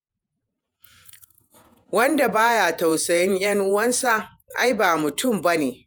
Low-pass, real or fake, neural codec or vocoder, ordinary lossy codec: none; fake; vocoder, 48 kHz, 128 mel bands, Vocos; none